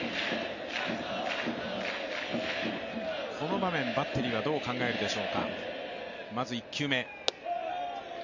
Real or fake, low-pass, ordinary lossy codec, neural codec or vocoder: real; 7.2 kHz; MP3, 48 kbps; none